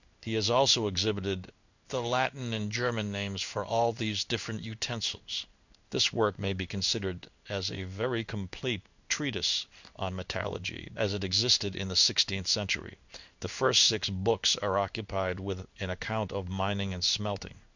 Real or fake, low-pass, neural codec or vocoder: fake; 7.2 kHz; codec, 16 kHz in and 24 kHz out, 1 kbps, XY-Tokenizer